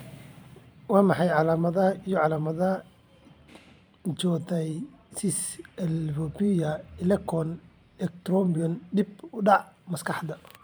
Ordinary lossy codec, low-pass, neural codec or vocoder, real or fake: none; none; vocoder, 44.1 kHz, 128 mel bands every 512 samples, BigVGAN v2; fake